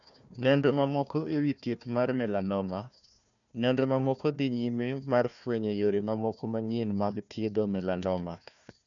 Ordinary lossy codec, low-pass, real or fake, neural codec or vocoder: none; 7.2 kHz; fake; codec, 16 kHz, 1 kbps, FunCodec, trained on Chinese and English, 50 frames a second